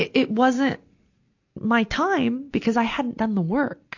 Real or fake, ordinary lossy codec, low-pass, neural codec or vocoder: real; AAC, 48 kbps; 7.2 kHz; none